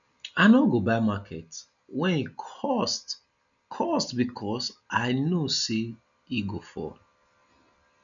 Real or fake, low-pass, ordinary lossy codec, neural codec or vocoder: real; 7.2 kHz; none; none